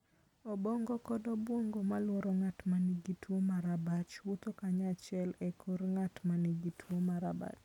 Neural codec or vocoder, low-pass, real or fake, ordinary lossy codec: none; 19.8 kHz; real; none